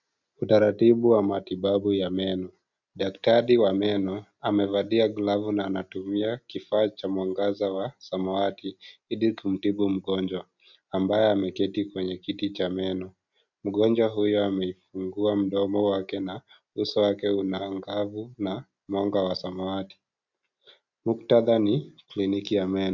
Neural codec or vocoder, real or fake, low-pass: none; real; 7.2 kHz